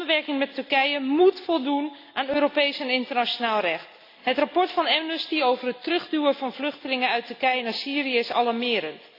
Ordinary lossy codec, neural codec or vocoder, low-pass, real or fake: AAC, 32 kbps; none; 5.4 kHz; real